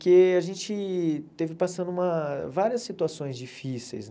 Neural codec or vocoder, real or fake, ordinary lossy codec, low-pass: none; real; none; none